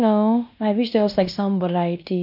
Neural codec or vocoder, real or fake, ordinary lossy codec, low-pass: codec, 16 kHz in and 24 kHz out, 0.9 kbps, LongCat-Audio-Codec, fine tuned four codebook decoder; fake; none; 5.4 kHz